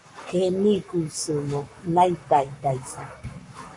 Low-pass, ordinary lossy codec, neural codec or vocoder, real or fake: 10.8 kHz; MP3, 48 kbps; codec, 44.1 kHz, 7.8 kbps, Pupu-Codec; fake